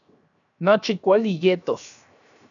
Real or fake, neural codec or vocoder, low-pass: fake; codec, 16 kHz, 0.7 kbps, FocalCodec; 7.2 kHz